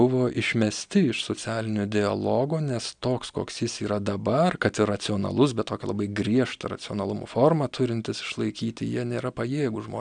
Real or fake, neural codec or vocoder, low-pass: real; none; 9.9 kHz